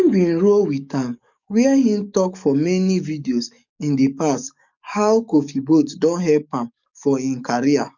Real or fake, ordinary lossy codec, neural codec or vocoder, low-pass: fake; none; codec, 44.1 kHz, 7.8 kbps, DAC; 7.2 kHz